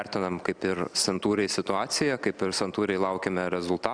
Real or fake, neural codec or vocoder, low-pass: real; none; 9.9 kHz